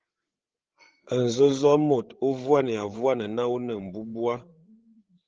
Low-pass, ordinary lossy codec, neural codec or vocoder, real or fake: 7.2 kHz; Opus, 16 kbps; codec, 16 kHz, 8 kbps, FreqCodec, larger model; fake